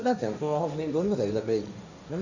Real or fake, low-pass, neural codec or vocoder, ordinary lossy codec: fake; 7.2 kHz; codec, 16 kHz, 1.1 kbps, Voila-Tokenizer; none